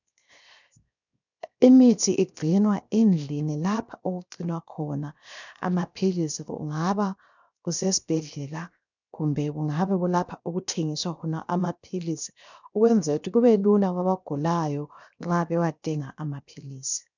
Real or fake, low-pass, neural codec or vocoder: fake; 7.2 kHz; codec, 16 kHz, 0.7 kbps, FocalCodec